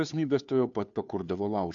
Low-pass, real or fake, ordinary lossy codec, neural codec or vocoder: 7.2 kHz; fake; AAC, 64 kbps; codec, 16 kHz, 2 kbps, FunCodec, trained on Chinese and English, 25 frames a second